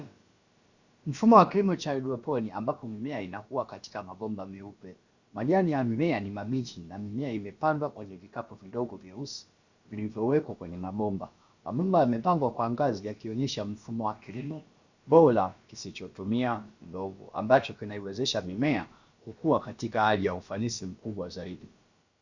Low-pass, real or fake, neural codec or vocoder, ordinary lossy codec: 7.2 kHz; fake; codec, 16 kHz, about 1 kbps, DyCAST, with the encoder's durations; Opus, 64 kbps